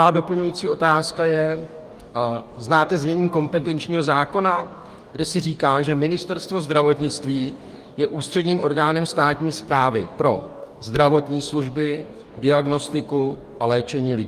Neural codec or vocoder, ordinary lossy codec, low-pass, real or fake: codec, 44.1 kHz, 2.6 kbps, DAC; Opus, 32 kbps; 14.4 kHz; fake